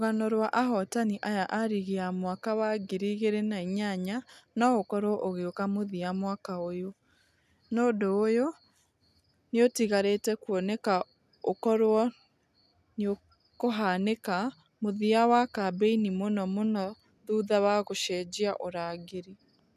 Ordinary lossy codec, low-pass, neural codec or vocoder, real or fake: none; 14.4 kHz; none; real